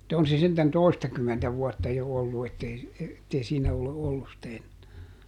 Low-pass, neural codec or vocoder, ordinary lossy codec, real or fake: 19.8 kHz; none; none; real